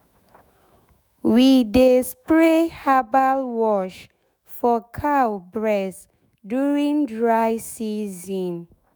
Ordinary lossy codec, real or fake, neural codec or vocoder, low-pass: none; fake; autoencoder, 48 kHz, 128 numbers a frame, DAC-VAE, trained on Japanese speech; none